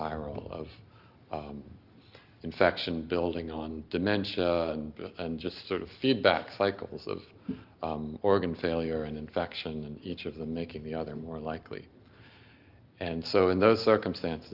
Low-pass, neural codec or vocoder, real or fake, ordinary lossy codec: 5.4 kHz; none; real; Opus, 32 kbps